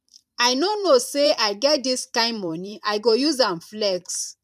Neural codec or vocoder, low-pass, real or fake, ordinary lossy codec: vocoder, 44.1 kHz, 128 mel bands every 512 samples, BigVGAN v2; 14.4 kHz; fake; none